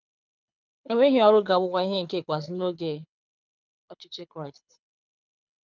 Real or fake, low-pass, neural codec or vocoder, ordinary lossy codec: fake; 7.2 kHz; codec, 24 kHz, 6 kbps, HILCodec; none